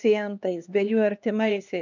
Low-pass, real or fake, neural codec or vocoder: 7.2 kHz; fake; codec, 16 kHz, 2 kbps, X-Codec, HuBERT features, trained on LibriSpeech